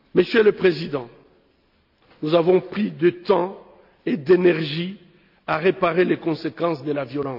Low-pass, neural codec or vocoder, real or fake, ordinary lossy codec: 5.4 kHz; none; real; AAC, 48 kbps